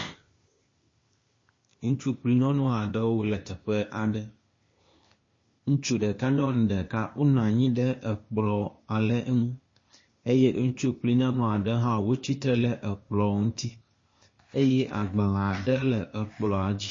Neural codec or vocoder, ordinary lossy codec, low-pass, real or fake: codec, 16 kHz, 0.8 kbps, ZipCodec; MP3, 32 kbps; 7.2 kHz; fake